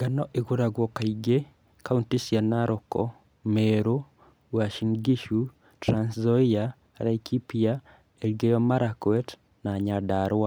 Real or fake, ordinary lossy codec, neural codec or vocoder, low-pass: real; none; none; none